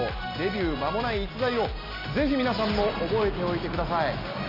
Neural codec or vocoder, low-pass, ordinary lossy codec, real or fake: none; 5.4 kHz; none; real